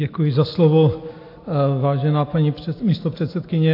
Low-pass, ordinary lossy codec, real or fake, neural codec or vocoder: 5.4 kHz; AAC, 32 kbps; real; none